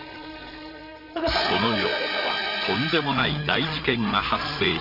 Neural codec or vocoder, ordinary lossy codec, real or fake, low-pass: codec, 16 kHz, 8 kbps, FreqCodec, larger model; none; fake; 5.4 kHz